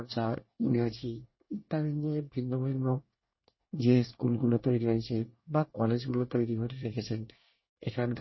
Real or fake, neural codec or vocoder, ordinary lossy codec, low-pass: fake; codec, 24 kHz, 1 kbps, SNAC; MP3, 24 kbps; 7.2 kHz